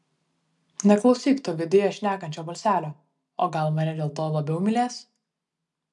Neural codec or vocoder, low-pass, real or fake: none; 10.8 kHz; real